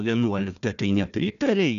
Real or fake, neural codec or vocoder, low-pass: fake; codec, 16 kHz, 1 kbps, FunCodec, trained on Chinese and English, 50 frames a second; 7.2 kHz